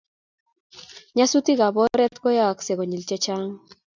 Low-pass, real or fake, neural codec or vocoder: 7.2 kHz; real; none